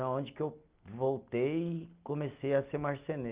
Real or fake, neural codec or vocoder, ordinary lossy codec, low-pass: real; none; Opus, 32 kbps; 3.6 kHz